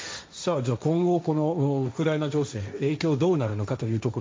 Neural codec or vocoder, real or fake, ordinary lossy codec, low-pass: codec, 16 kHz, 1.1 kbps, Voila-Tokenizer; fake; none; none